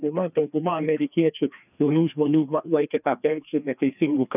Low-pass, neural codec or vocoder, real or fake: 3.6 kHz; codec, 16 kHz, 2 kbps, FreqCodec, larger model; fake